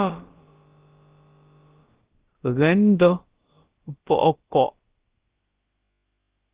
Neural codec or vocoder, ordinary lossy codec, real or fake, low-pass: codec, 16 kHz, about 1 kbps, DyCAST, with the encoder's durations; Opus, 16 kbps; fake; 3.6 kHz